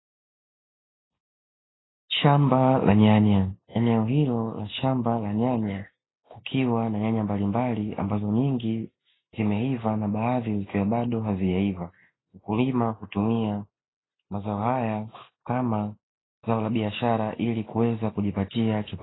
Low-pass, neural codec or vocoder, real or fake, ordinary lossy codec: 7.2 kHz; none; real; AAC, 16 kbps